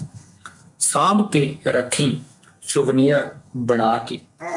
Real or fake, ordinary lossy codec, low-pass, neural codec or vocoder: fake; MP3, 96 kbps; 10.8 kHz; codec, 32 kHz, 1.9 kbps, SNAC